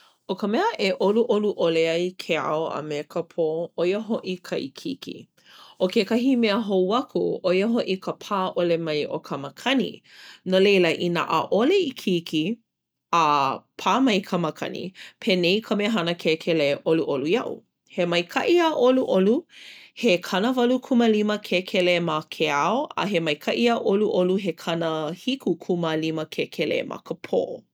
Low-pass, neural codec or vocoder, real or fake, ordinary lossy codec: none; none; real; none